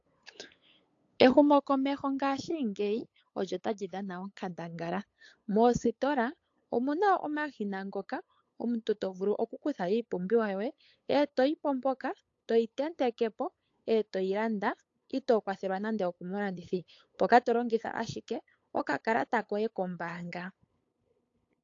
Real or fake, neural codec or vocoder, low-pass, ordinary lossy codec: fake; codec, 16 kHz, 8 kbps, FunCodec, trained on LibriTTS, 25 frames a second; 7.2 kHz; AAC, 48 kbps